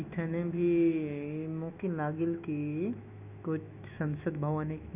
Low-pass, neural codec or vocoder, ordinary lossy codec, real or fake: 3.6 kHz; autoencoder, 48 kHz, 128 numbers a frame, DAC-VAE, trained on Japanese speech; none; fake